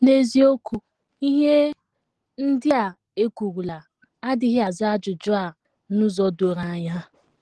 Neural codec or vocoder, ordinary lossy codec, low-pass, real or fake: none; Opus, 16 kbps; 10.8 kHz; real